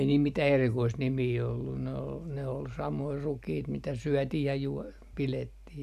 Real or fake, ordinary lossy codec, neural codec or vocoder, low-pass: real; none; none; 14.4 kHz